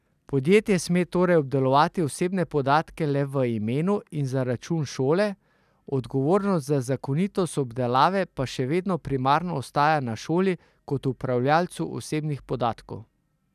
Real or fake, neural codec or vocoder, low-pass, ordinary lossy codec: real; none; 14.4 kHz; none